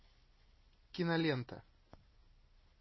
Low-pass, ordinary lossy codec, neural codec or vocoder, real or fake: 7.2 kHz; MP3, 24 kbps; none; real